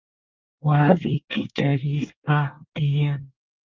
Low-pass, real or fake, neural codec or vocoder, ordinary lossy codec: 7.2 kHz; fake; codec, 24 kHz, 1 kbps, SNAC; Opus, 32 kbps